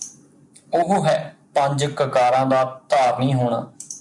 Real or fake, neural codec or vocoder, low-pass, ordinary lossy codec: real; none; 10.8 kHz; MP3, 96 kbps